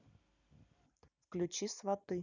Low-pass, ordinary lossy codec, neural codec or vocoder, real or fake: 7.2 kHz; none; none; real